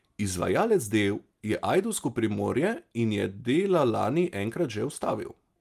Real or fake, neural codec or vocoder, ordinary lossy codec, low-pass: real; none; Opus, 32 kbps; 14.4 kHz